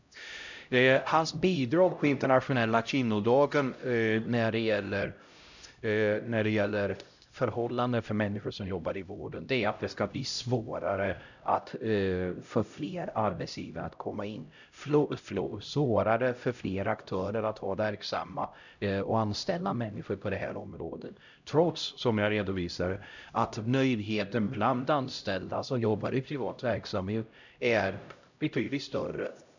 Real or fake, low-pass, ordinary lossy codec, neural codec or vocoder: fake; 7.2 kHz; none; codec, 16 kHz, 0.5 kbps, X-Codec, HuBERT features, trained on LibriSpeech